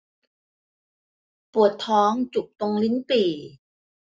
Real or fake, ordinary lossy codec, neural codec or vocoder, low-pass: real; none; none; none